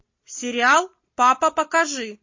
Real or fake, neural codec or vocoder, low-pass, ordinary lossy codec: real; none; 7.2 kHz; MP3, 32 kbps